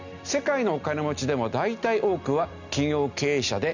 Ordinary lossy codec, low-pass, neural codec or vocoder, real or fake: none; 7.2 kHz; none; real